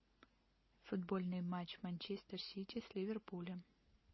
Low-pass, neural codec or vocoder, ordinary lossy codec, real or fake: 7.2 kHz; none; MP3, 24 kbps; real